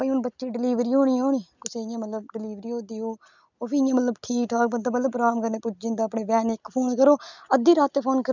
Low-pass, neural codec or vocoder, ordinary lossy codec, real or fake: 7.2 kHz; none; none; real